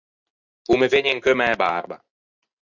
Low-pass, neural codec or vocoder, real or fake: 7.2 kHz; none; real